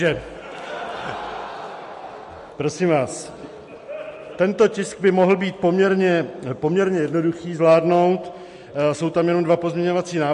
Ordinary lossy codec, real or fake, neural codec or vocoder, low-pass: MP3, 48 kbps; real; none; 10.8 kHz